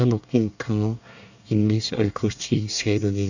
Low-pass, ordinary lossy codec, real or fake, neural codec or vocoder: 7.2 kHz; none; fake; codec, 24 kHz, 1 kbps, SNAC